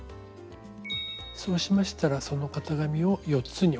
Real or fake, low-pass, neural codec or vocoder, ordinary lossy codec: real; none; none; none